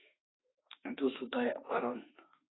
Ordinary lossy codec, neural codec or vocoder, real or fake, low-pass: AAC, 16 kbps; autoencoder, 48 kHz, 32 numbers a frame, DAC-VAE, trained on Japanese speech; fake; 7.2 kHz